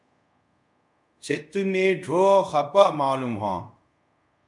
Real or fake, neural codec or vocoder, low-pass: fake; codec, 24 kHz, 0.5 kbps, DualCodec; 10.8 kHz